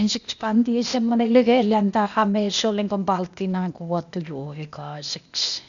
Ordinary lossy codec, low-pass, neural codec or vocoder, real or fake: none; 7.2 kHz; codec, 16 kHz, 0.8 kbps, ZipCodec; fake